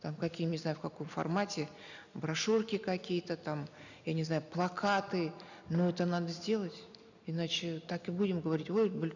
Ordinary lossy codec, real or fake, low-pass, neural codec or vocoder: none; real; 7.2 kHz; none